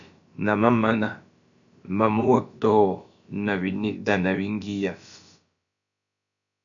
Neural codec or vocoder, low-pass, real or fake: codec, 16 kHz, about 1 kbps, DyCAST, with the encoder's durations; 7.2 kHz; fake